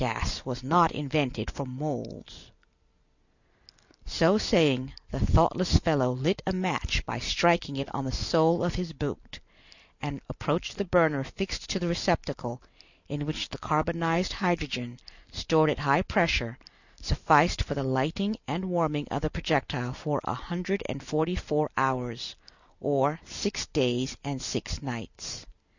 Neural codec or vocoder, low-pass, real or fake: none; 7.2 kHz; real